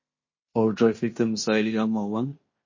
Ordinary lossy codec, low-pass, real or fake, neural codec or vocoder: MP3, 32 kbps; 7.2 kHz; fake; codec, 16 kHz in and 24 kHz out, 0.9 kbps, LongCat-Audio-Codec, fine tuned four codebook decoder